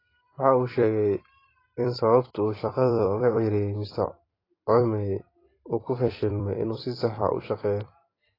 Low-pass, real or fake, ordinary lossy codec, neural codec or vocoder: 5.4 kHz; fake; AAC, 24 kbps; vocoder, 44.1 kHz, 128 mel bands every 256 samples, BigVGAN v2